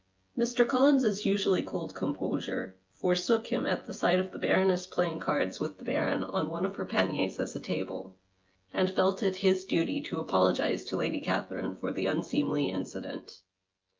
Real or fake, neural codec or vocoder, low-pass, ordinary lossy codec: fake; vocoder, 24 kHz, 100 mel bands, Vocos; 7.2 kHz; Opus, 24 kbps